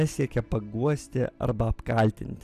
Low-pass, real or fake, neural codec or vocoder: 14.4 kHz; fake; vocoder, 44.1 kHz, 128 mel bands every 256 samples, BigVGAN v2